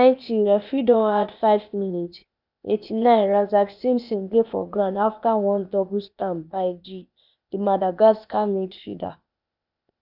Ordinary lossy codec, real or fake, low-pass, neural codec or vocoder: none; fake; 5.4 kHz; codec, 16 kHz, 0.8 kbps, ZipCodec